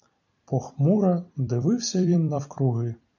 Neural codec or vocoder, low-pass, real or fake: vocoder, 44.1 kHz, 128 mel bands every 256 samples, BigVGAN v2; 7.2 kHz; fake